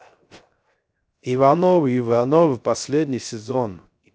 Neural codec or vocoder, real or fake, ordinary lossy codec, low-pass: codec, 16 kHz, 0.3 kbps, FocalCodec; fake; none; none